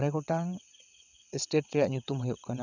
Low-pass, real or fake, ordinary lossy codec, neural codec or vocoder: 7.2 kHz; fake; none; vocoder, 22.05 kHz, 80 mel bands, WaveNeXt